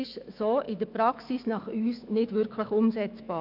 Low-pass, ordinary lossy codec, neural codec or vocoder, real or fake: 5.4 kHz; none; autoencoder, 48 kHz, 128 numbers a frame, DAC-VAE, trained on Japanese speech; fake